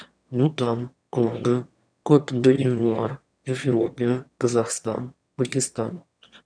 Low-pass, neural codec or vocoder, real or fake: 9.9 kHz; autoencoder, 22.05 kHz, a latent of 192 numbers a frame, VITS, trained on one speaker; fake